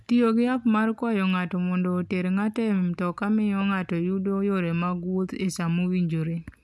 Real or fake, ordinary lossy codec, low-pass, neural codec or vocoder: real; none; none; none